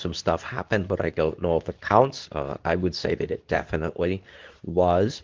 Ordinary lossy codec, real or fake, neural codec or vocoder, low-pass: Opus, 32 kbps; fake; codec, 24 kHz, 0.9 kbps, WavTokenizer, medium speech release version 2; 7.2 kHz